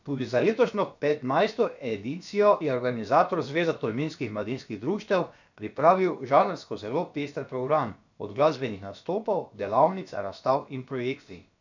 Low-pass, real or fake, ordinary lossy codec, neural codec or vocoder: 7.2 kHz; fake; none; codec, 16 kHz, about 1 kbps, DyCAST, with the encoder's durations